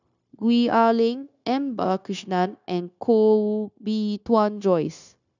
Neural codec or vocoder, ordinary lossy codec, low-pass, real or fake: codec, 16 kHz, 0.9 kbps, LongCat-Audio-Codec; none; 7.2 kHz; fake